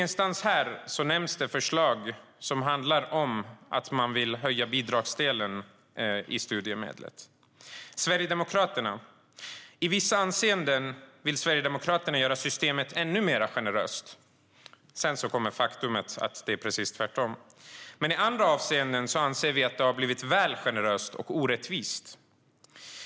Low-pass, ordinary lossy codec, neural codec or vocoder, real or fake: none; none; none; real